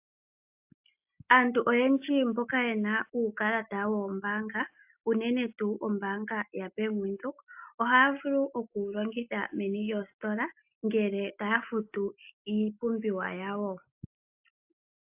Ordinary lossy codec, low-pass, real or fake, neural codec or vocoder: AAC, 32 kbps; 3.6 kHz; real; none